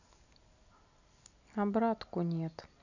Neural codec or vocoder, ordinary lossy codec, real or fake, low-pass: none; none; real; 7.2 kHz